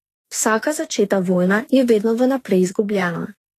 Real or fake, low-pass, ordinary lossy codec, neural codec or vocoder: fake; 14.4 kHz; AAC, 48 kbps; autoencoder, 48 kHz, 32 numbers a frame, DAC-VAE, trained on Japanese speech